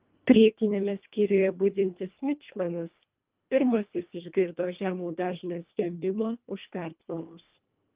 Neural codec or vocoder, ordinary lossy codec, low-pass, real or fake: codec, 24 kHz, 1.5 kbps, HILCodec; Opus, 32 kbps; 3.6 kHz; fake